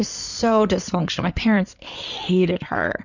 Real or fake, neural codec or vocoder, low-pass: fake; codec, 16 kHz in and 24 kHz out, 2.2 kbps, FireRedTTS-2 codec; 7.2 kHz